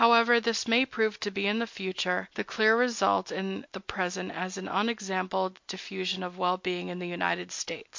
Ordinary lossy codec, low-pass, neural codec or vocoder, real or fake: MP3, 48 kbps; 7.2 kHz; none; real